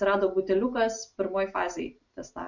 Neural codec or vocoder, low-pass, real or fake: none; 7.2 kHz; real